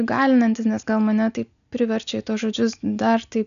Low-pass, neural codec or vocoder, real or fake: 7.2 kHz; none; real